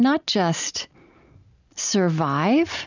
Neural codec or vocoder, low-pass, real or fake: none; 7.2 kHz; real